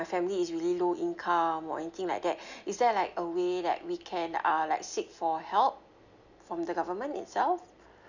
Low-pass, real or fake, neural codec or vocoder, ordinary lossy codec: 7.2 kHz; real; none; none